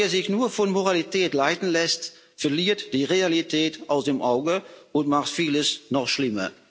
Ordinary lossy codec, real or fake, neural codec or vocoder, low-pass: none; real; none; none